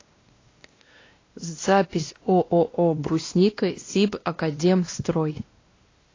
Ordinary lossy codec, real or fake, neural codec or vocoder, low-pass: AAC, 32 kbps; fake; codec, 16 kHz, 1 kbps, X-Codec, WavLM features, trained on Multilingual LibriSpeech; 7.2 kHz